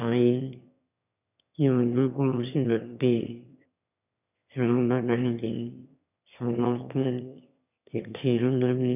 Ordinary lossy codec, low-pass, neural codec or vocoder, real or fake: none; 3.6 kHz; autoencoder, 22.05 kHz, a latent of 192 numbers a frame, VITS, trained on one speaker; fake